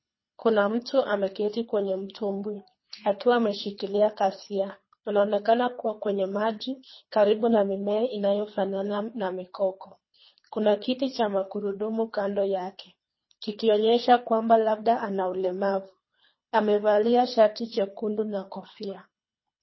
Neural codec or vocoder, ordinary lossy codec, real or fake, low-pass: codec, 24 kHz, 3 kbps, HILCodec; MP3, 24 kbps; fake; 7.2 kHz